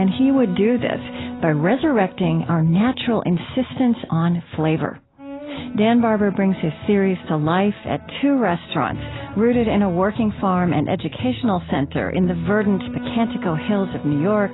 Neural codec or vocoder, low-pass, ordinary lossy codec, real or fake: none; 7.2 kHz; AAC, 16 kbps; real